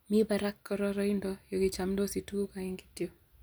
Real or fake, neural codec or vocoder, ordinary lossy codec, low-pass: real; none; none; none